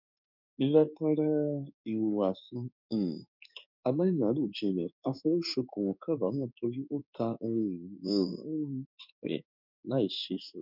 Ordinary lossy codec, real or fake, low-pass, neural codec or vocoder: none; fake; 5.4 kHz; codec, 16 kHz in and 24 kHz out, 1 kbps, XY-Tokenizer